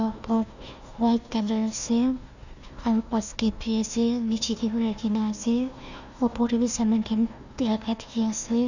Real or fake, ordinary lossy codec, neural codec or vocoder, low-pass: fake; none; codec, 16 kHz, 1 kbps, FunCodec, trained on Chinese and English, 50 frames a second; 7.2 kHz